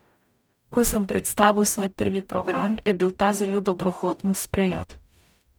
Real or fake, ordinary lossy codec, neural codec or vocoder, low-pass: fake; none; codec, 44.1 kHz, 0.9 kbps, DAC; none